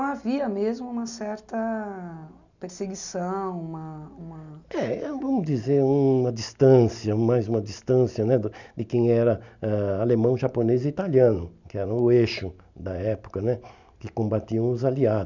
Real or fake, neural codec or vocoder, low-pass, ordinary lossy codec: real; none; 7.2 kHz; none